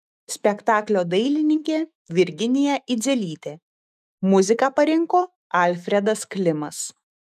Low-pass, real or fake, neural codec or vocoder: 14.4 kHz; fake; autoencoder, 48 kHz, 128 numbers a frame, DAC-VAE, trained on Japanese speech